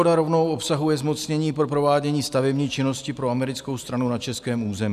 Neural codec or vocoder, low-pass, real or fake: none; 14.4 kHz; real